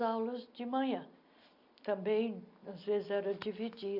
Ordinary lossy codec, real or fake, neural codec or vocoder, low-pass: none; real; none; 5.4 kHz